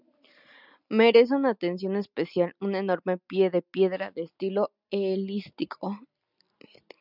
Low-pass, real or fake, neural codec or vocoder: 5.4 kHz; real; none